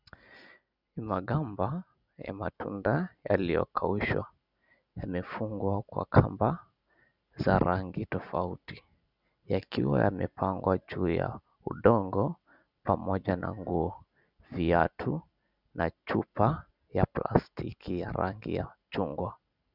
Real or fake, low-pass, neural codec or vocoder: real; 5.4 kHz; none